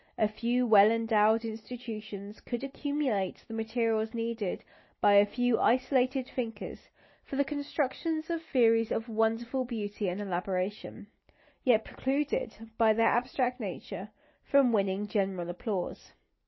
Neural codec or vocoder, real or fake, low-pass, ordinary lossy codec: none; real; 7.2 kHz; MP3, 24 kbps